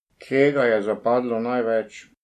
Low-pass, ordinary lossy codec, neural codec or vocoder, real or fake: 14.4 kHz; MP3, 64 kbps; none; real